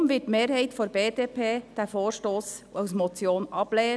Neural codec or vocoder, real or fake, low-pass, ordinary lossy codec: none; real; none; none